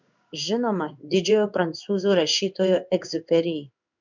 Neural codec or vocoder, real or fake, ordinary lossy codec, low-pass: codec, 16 kHz in and 24 kHz out, 1 kbps, XY-Tokenizer; fake; MP3, 64 kbps; 7.2 kHz